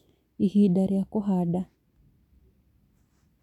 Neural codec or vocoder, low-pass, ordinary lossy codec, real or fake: none; 19.8 kHz; none; real